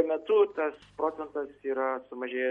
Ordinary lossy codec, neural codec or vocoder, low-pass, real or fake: MP3, 48 kbps; none; 19.8 kHz; real